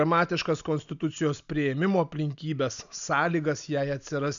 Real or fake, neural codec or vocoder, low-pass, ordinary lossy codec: real; none; 7.2 kHz; MP3, 96 kbps